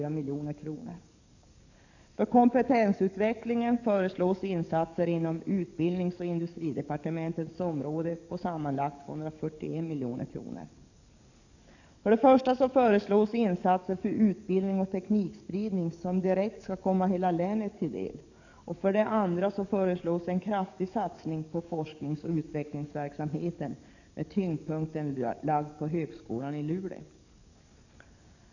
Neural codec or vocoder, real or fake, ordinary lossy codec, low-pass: codec, 44.1 kHz, 7.8 kbps, DAC; fake; none; 7.2 kHz